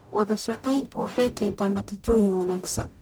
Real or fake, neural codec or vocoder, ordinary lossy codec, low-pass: fake; codec, 44.1 kHz, 0.9 kbps, DAC; none; none